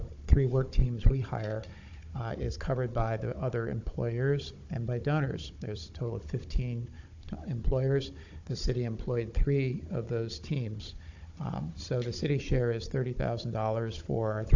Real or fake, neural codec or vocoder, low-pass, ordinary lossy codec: fake; codec, 16 kHz, 16 kbps, FunCodec, trained on Chinese and English, 50 frames a second; 7.2 kHz; AAC, 48 kbps